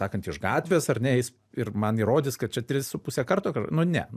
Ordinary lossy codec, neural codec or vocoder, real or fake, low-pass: AAC, 96 kbps; none; real; 14.4 kHz